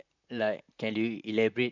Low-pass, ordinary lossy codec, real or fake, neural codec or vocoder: 7.2 kHz; none; fake; vocoder, 44.1 kHz, 128 mel bands, Pupu-Vocoder